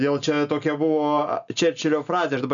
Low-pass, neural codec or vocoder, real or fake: 7.2 kHz; none; real